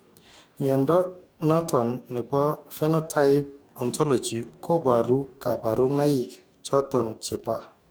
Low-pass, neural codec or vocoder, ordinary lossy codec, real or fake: none; codec, 44.1 kHz, 2.6 kbps, DAC; none; fake